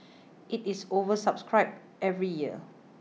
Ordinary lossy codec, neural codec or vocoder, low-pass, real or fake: none; none; none; real